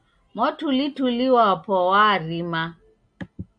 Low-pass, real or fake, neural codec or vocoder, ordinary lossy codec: 9.9 kHz; real; none; MP3, 96 kbps